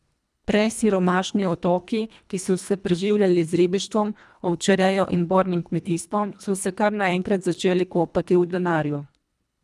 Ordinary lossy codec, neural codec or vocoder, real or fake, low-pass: none; codec, 24 kHz, 1.5 kbps, HILCodec; fake; none